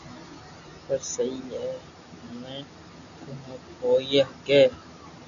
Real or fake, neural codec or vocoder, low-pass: real; none; 7.2 kHz